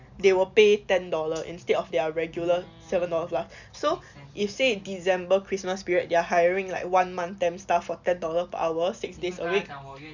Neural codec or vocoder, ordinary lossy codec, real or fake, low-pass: none; none; real; 7.2 kHz